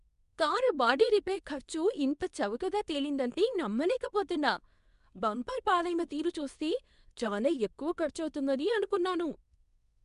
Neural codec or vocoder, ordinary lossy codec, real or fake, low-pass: codec, 24 kHz, 0.9 kbps, WavTokenizer, medium speech release version 2; none; fake; 10.8 kHz